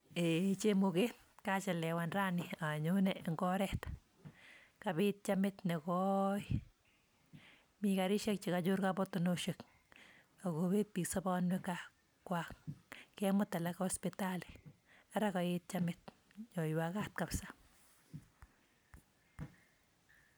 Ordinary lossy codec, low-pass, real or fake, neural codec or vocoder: none; none; real; none